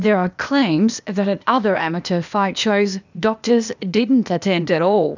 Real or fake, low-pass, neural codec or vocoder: fake; 7.2 kHz; codec, 16 kHz, 0.8 kbps, ZipCodec